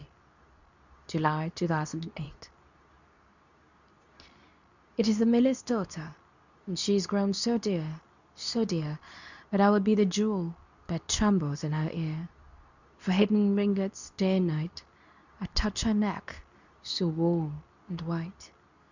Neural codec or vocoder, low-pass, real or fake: codec, 24 kHz, 0.9 kbps, WavTokenizer, medium speech release version 2; 7.2 kHz; fake